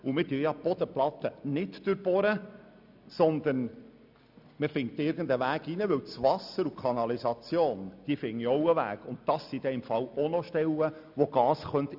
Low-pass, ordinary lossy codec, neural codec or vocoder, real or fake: 5.4 kHz; none; none; real